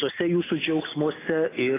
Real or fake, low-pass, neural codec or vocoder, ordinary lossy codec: real; 3.6 kHz; none; AAC, 16 kbps